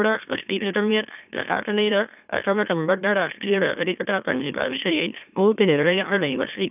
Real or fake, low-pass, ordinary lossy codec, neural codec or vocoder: fake; 3.6 kHz; none; autoencoder, 44.1 kHz, a latent of 192 numbers a frame, MeloTTS